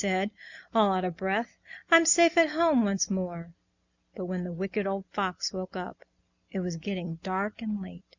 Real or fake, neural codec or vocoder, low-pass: real; none; 7.2 kHz